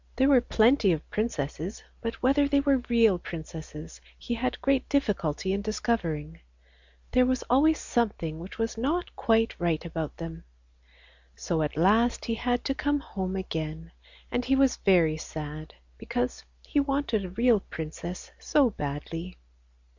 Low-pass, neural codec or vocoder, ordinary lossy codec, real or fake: 7.2 kHz; none; Opus, 64 kbps; real